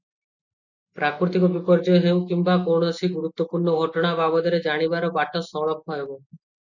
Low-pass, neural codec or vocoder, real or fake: 7.2 kHz; none; real